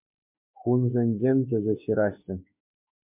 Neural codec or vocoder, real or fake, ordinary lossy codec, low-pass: autoencoder, 48 kHz, 32 numbers a frame, DAC-VAE, trained on Japanese speech; fake; MP3, 32 kbps; 3.6 kHz